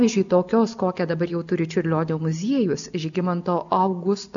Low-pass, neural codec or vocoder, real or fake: 7.2 kHz; none; real